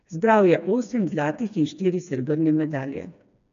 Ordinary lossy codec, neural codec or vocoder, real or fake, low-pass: AAC, 96 kbps; codec, 16 kHz, 2 kbps, FreqCodec, smaller model; fake; 7.2 kHz